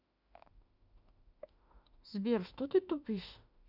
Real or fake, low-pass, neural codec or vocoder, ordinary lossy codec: fake; 5.4 kHz; autoencoder, 48 kHz, 32 numbers a frame, DAC-VAE, trained on Japanese speech; none